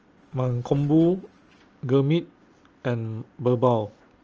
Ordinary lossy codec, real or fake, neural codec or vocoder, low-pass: Opus, 24 kbps; real; none; 7.2 kHz